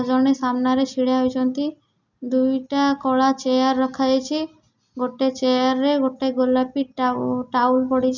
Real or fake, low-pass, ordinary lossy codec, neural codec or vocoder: real; 7.2 kHz; none; none